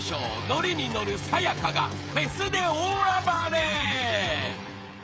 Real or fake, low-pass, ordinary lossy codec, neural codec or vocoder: fake; none; none; codec, 16 kHz, 16 kbps, FreqCodec, smaller model